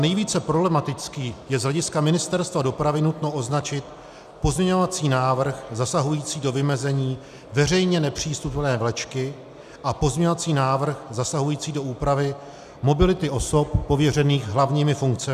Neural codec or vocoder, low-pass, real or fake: none; 14.4 kHz; real